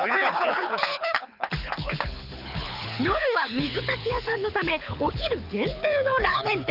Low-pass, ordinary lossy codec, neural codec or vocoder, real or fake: 5.4 kHz; none; codec, 24 kHz, 6 kbps, HILCodec; fake